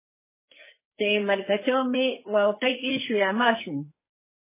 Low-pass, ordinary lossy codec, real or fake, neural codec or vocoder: 3.6 kHz; MP3, 16 kbps; fake; codec, 44.1 kHz, 2.6 kbps, SNAC